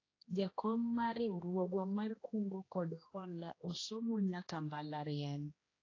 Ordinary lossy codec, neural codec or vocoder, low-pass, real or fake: AAC, 32 kbps; codec, 16 kHz, 1 kbps, X-Codec, HuBERT features, trained on general audio; 7.2 kHz; fake